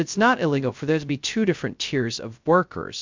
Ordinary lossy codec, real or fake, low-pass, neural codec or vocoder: MP3, 64 kbps; fake; 7.2 kHz; codec, 16 kHz, 0.2 kbps, FocalCodec